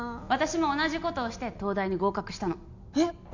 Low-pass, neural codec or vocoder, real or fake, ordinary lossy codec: 7.2 kHz; none; real; none